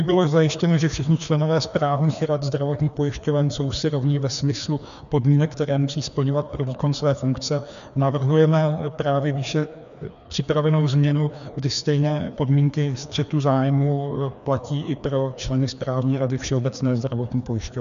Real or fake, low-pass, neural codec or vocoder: fake; 7.2 kHz; codec, 16 kHz, 2 kbps, FreqCodec, larger model